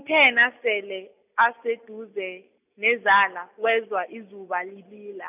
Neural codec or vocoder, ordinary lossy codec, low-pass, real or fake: none; none; 3.6 kHz; real